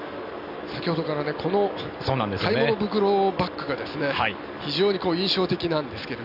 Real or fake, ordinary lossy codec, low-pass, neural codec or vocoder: real; none; 5.4 kHz; none